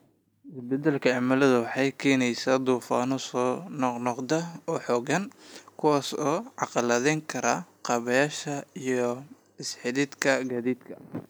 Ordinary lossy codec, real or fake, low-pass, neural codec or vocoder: none; real; none; none